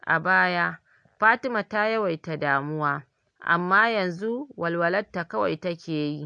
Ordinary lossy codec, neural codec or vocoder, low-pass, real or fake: AAC, 64 kbps; none; 9.9 kHz; real